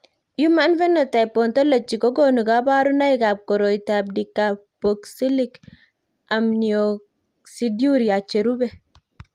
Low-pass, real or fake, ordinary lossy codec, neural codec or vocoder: 14.4 kHz; real; Opus, 32 kbps; none